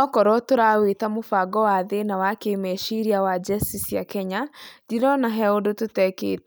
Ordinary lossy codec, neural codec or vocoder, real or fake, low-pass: none; none; real; none